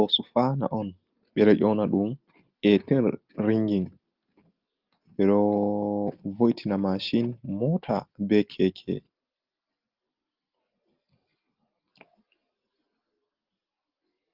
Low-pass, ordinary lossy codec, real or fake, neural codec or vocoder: 5.4 kHz; Opus, 32 kbps; real; none